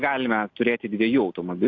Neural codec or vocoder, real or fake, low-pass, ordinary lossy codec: none; real; 7.2 kHz; AAC, 48 kbps